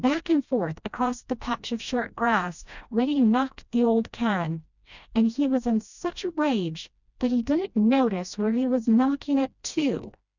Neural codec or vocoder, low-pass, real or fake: codec, 16 kHz, 1 kbps, FreqCodec, smaller model; 7.2 kHz; fake